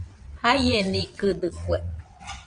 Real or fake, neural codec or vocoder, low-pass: fake; vocoder, 22.05 kHz, 80 mel bands, WaveNeXt; 9.9 kHz